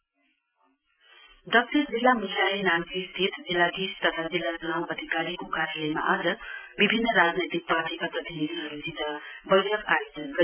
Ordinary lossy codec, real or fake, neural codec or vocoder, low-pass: none; real; none; 3.6 kHz